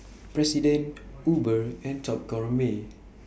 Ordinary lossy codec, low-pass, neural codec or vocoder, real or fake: none; none; none; real